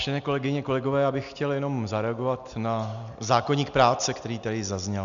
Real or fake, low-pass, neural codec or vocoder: real; 7.2 kHz; none